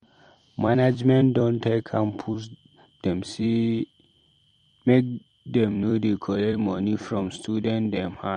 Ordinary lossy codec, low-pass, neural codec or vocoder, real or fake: MP3, 48 kbps; 19.8 kHz; vocoder, 44.1 kHz, 128 mel bands every 256 samples, BigVGAN v2; fake